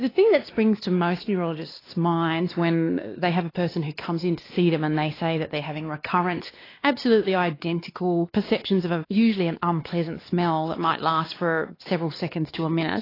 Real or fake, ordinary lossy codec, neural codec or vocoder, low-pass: fake; AAC, 24 kbps; codec, 16 kHz, 2 kbps, X-Codec, WavLM features, trained on Multilingual LibriSpeech; 5.4 kHz